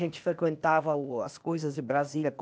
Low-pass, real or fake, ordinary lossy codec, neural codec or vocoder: none; fake; none; codec, 16 kHz, 0.8 kbps, ZipCodec